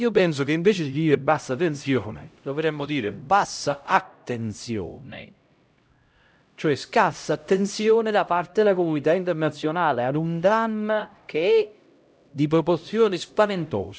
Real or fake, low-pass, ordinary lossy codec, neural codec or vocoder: fake; none; none; codec, 16 kHz, 0.5 kbps, X-Codec, HuBERT features, trained on LibriSpeech